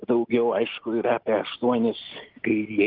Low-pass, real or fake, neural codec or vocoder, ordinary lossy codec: 5.4 kHz; real; none; Opus, 32 kbps